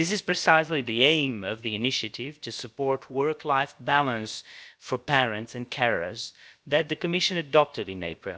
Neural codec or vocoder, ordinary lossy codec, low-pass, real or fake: codec, 16 kHz, about 1 kbps, DyCAST, with the encoder's durations; none; none; fake